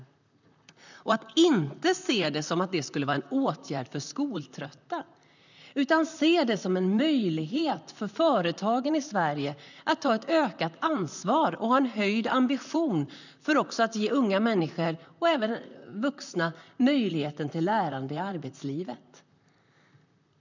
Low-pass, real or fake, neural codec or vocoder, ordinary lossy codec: 7.2 kHz; fake; vocoder, 44.1 kHz, 128 mel bands, Pupu-Vocoder; none